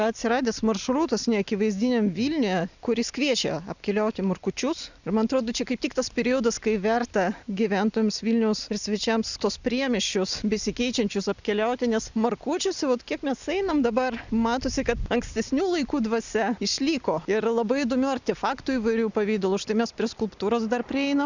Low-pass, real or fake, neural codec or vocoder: 7.2 kHz; real; none